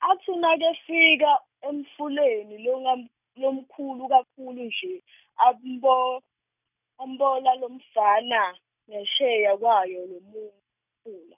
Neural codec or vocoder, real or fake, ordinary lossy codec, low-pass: none; real; none; 3.6 kHz